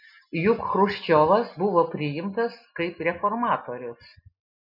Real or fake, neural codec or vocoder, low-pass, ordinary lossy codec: real; none; 5.4 kHz; MP3, 48 kbps